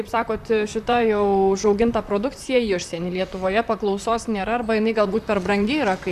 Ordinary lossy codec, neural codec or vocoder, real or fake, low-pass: AAC, 96 kbps; vocoder, 44.1 kHz, 128 mel bands, Pupu-Vocoder; fake; 14.4 kHz